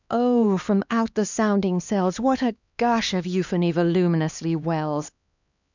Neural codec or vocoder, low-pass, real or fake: codec, 16 kHz, 2 kbps, X-Codec, HuBERT features, trained on LibriSpeech; 7.2 kHz; fake